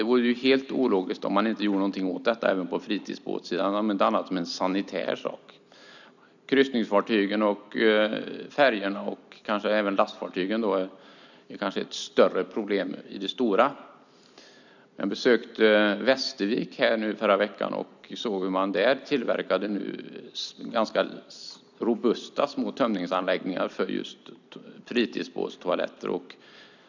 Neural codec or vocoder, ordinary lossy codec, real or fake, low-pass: none; none; real; 7.2 kHz